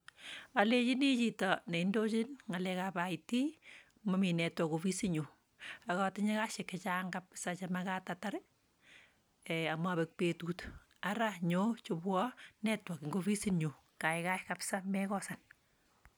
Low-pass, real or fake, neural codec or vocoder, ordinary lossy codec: none; real; none; none